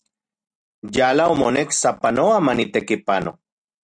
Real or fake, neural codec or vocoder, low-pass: real; none; 9.9 kHz